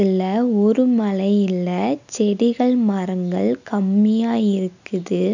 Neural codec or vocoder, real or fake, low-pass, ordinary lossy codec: none; real; 7.2 kHz; none